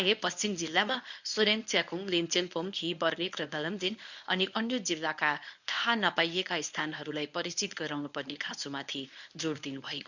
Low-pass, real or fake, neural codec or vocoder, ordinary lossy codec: 7.2 kHz; fake; codec, 24 kHz, 0.9 kbps, WavTokenizer, medium speech release version 1; none